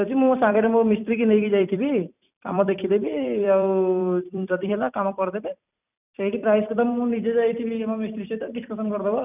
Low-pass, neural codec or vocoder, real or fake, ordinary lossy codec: 3.6 kHz; vocoder, 44.1 kHz, 128 mel bands every 256 samples, BigVGAN v2; fake; none